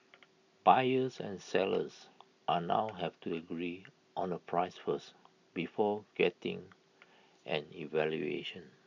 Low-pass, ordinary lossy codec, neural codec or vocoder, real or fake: 7.2 kHz; none; none; real